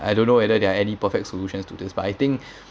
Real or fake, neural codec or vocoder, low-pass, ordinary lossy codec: real; none; none; none